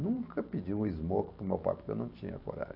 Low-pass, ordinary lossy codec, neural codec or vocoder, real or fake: 5.4 kHz; MP3, 48 kbps; vocoder, 44.1 kHz, 128 mel bands every 512 samples, BigVGAN v2; fake